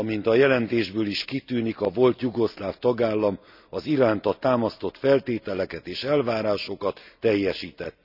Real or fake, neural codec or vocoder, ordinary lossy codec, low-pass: real; none; none; 5.4 kHz